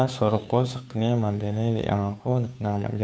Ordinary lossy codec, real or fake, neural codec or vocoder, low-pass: none; fake; codec, 16 kHz, 2 kbps, FunCodec, trained on Chinese and English, 25 frames a second; none